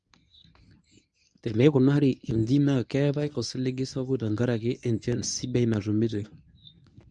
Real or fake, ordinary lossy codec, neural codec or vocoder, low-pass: fake; none; codec, 24 kHz, 0.9 kbps, WavTokenizer, medium speech release version 1; 10.8 kHz